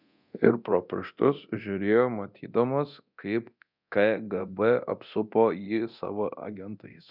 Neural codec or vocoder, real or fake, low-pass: codec, 24 kHz, 0.9 kbps, DualCodec; fake; 5.4 kHz